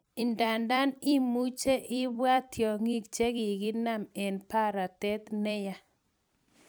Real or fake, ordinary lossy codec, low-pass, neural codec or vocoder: fake; none; none; vocoder, 44.1 kHz, 128 mel bands every 256 samples, BigVGAN v2